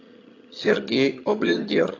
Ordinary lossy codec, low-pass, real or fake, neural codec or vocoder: AAC, 32 kbps; 7.2 kHz; fake; vocoder, 22.05 kHz, 80 mel bands, HiFi-GAN